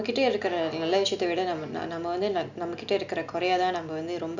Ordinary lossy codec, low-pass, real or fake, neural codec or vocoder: none; 7.2 kHz; real; none